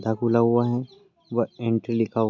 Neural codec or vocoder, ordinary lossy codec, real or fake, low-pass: none; none; real; 7.2 kHz